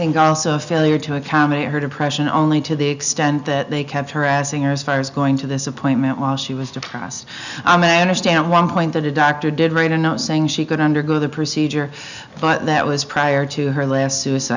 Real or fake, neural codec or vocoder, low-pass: real; none; 7.2 kHz